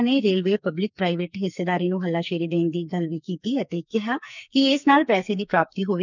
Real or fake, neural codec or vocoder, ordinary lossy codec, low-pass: fake; codec, 44.1 kHz, 2.6 kbps, SNAC; none; 7.2 kHz